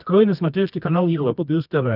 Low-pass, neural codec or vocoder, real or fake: 5.4 kHz; codec, 24 kHz, 0.9 kbps, WavTokenizer, medium music audio release; fake